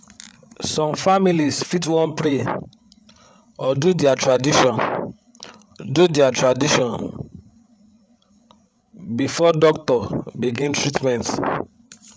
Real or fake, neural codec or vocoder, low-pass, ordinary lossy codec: fake; codec, 16 kHz, 8 kbps, FreqCodec, larger model; none; none